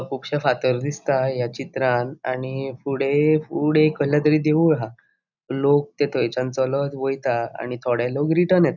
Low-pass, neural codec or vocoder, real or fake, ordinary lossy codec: 7.2 kHz; none; real; none